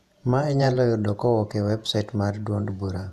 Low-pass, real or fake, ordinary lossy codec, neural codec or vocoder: 14.4 kHz; fake; none; vocoder, 48 kHz, 128 mel bands, Vocos